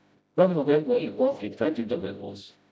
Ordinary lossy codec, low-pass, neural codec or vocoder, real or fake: none; none; codec, 16 kHz, 0.5 kbps, FreqCodec, smaller model; fake